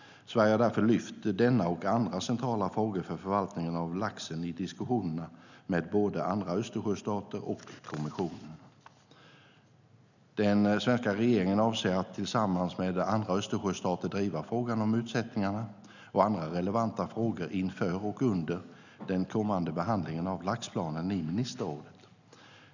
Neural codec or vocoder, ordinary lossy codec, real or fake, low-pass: none; none; real; 7.2 kHz